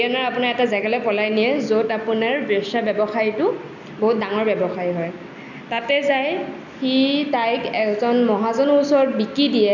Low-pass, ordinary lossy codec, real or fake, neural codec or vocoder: 7.2 kHz; none; real; none